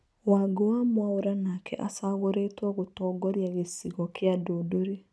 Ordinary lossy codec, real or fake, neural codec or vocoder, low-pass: none; real; none; none